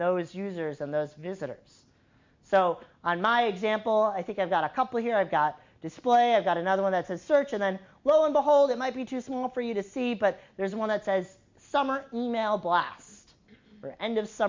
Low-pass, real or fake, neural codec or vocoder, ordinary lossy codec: 7.2 kHz; real; none; MP3, 48 kbps